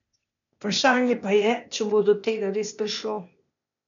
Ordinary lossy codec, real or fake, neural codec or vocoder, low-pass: none; fake; codec, 16 kHz, 0.8 kbps, ZipCodec; 7.2 kHz